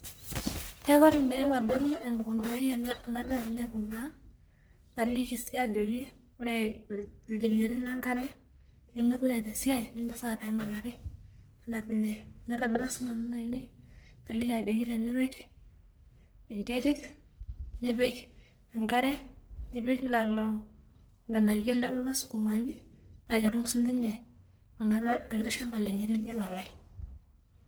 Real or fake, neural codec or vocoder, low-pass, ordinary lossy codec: fake; codec, 44.1 kHz, 1.7 kbps, Pupu-Codec; none; none